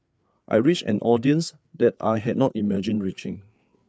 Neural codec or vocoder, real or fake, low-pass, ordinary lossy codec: codec, 16 kHz, 4 kbps, FreqCodec, larger model; fake; none; none